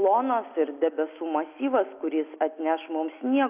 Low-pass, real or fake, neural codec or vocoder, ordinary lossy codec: 3.6 kHz; real; none; AAC, 32 kbps